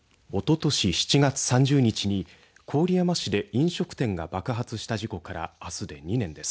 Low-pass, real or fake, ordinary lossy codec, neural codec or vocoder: none; real; none; none